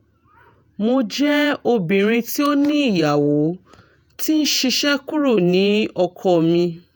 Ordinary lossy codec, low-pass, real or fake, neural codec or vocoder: none; none; fake; vocoder, 48 kHz, 128 mel bands, Vocos